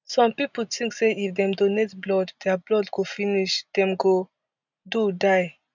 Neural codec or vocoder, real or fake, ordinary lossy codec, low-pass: none; real; none; 7.2 kHz